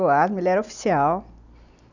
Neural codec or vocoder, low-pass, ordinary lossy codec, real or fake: none; 7.2 kHz; none; real